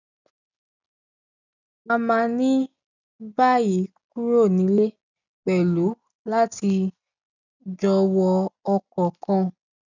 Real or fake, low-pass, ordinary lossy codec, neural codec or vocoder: real; 7.2 kHz; none; none